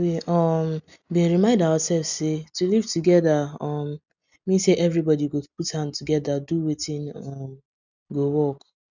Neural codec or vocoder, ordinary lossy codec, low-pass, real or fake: none; none; 7.2 kHz; real